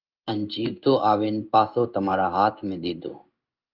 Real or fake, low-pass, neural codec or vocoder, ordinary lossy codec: fake; 5.4 kHz; codec, 16 kHz in and 24 kHz out, 1 kbps, XY-Tokenizer; Opus, 24 kbps